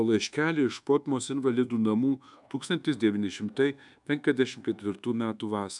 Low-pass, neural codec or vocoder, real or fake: 10.8 kHz; codec, 24 kHz, 1.2 kbps, DualCodec; fake